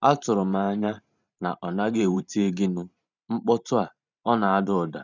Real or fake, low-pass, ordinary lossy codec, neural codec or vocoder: fake; 7.2 kHz; none; vocoder, 44.1 kHz, 128 mel bands every 512 samples, BigVGAN v2